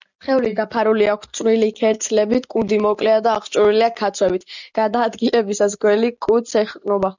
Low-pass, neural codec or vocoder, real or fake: 7.2 kHz; none; real